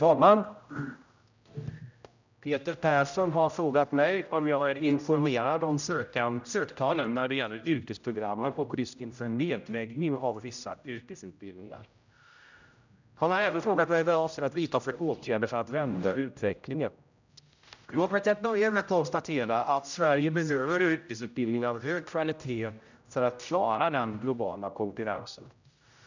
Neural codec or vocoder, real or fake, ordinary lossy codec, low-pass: codec, 16 kHz, 0.5 kbps, X-Codec, HuBERT features, trained on general audio; fake; none; 7.2 kHz